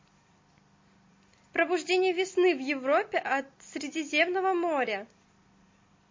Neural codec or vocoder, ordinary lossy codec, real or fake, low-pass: none; MP3, 32 kbps; real; 7.2 kHz